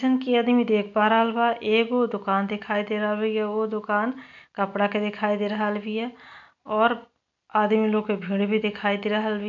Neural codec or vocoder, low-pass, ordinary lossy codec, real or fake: none; 7.2 kHz; none; real